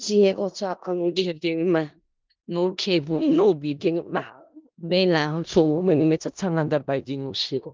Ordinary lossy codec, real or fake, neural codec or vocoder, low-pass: Opus, 32 kbps; fake; codec, 16 kHz in and 24 kHz out, 0.4 kbps, LongCat-Audio-Codec, four codebook decoder; 7.2 kHz